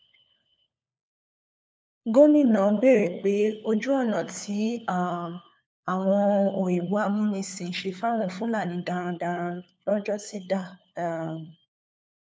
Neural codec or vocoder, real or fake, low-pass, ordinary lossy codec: codec, 16 kHz, 4 kbps, FunCodec, trained on LibriTTS, 50 frames a second; fake; none; none